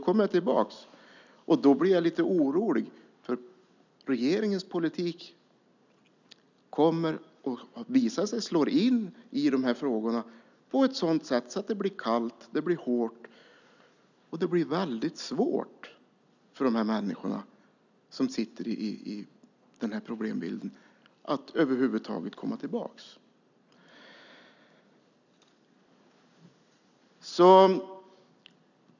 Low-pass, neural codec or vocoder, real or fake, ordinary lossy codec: 7.2 kHz; none; real; none